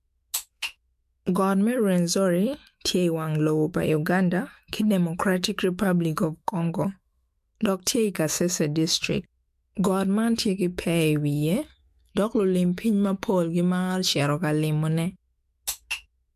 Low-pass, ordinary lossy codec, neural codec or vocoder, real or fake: 14.4 kHz; MP3, 64 kbps; autoencoder, 48 kHz, 128 numbers a frame, DAC-VAE, trained on Japanese speech; fake